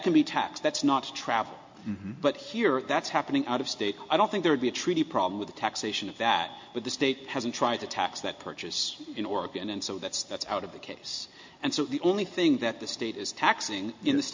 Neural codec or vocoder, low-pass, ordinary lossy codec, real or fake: none; 7.2 kHz; MP3, 48 kbps; real